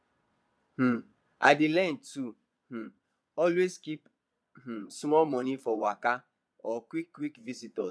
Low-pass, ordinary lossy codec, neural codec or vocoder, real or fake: none; none; vocoder, 22.05 kHz, 80 mel bands, Vocos; fake